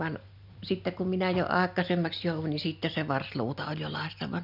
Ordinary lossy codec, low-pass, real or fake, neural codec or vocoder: none; 5.4 kHz; real; none